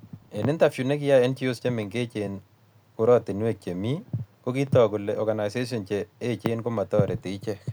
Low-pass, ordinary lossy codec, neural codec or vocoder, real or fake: none; none; none; real